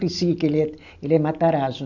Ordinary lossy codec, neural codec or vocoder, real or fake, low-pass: none; none; real; 7.2 kHz